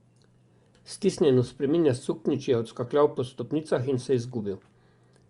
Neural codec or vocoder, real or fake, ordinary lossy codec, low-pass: vocoder, 24 kHz, 100 mel bands, Vocos; fake; Opus, 64 kbps; 10.8 kHz